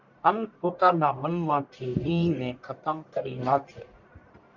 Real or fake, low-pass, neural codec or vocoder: fake; 7.2 kHz; codec, 44.1 kHz, 1.7 kbps, Pupu-Codec